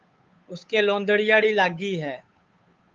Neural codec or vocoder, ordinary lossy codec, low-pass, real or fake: codec, 16 kHz, 8 kbps, FunCodec, trained on Chinese and English, 25 frames a second; Opus, 32 kbps; 7.2 kHz; fake